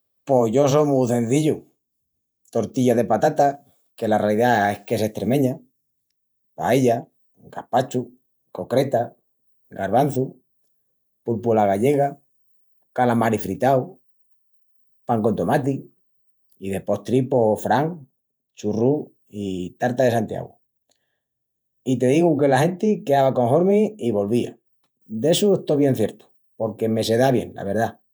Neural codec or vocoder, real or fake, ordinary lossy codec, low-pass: vocoder, 48 kHz, 128 mel bands, Vocos; fake; none; none